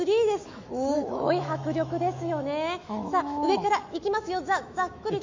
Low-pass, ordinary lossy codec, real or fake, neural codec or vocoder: 7.2 kHz; MP3, 48 kbps; fake; autoencoder, 48 kHz, 128 numbers a frame, DAC-VAE, trained on Japanese speech